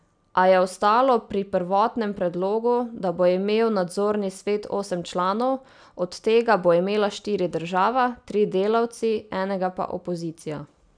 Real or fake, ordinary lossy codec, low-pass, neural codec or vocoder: real; none; 9.9 kHz; none